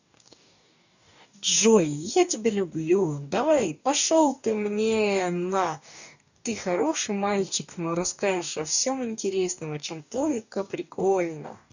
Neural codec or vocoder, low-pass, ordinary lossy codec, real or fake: codec, 44.1 kHz, 2.6 kbps, DAC; 7.2 kHz; none; fake